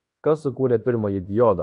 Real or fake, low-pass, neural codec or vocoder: fake; 10.8 kHz; codec, 16 kHz in and 24 kHz out, 0.9 kbps, LongCat-Audio-Codec, fine tuned four codebook decoder